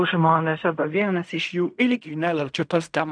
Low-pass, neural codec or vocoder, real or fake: 9.9 kHz; codec, 16 kHz in and 24 kHz out, 0.4 kbps, LongCat-Audio-Codec, fine tuned four codebook decoder; fake